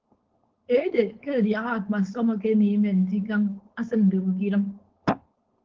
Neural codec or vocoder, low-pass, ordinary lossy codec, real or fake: codec, 16 kHz, 4.8 kbps, FACodec; 7.2 kHz; Opus, 16 kbps; fake